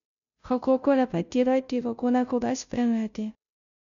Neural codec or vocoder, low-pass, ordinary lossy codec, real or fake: codec, 16 kHz, 0.5 kbps, FunCodec, trained on Chinese and English, 25 frames a second; 7.2 kHz; none; fake